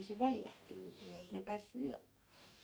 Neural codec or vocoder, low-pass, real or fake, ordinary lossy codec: codec, 44.1 kHz, 2.6 kbps, DAC; none; fake; none